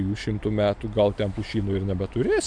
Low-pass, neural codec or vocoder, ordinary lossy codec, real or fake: 9.9 kHz; none; MP3, 96 kbps; real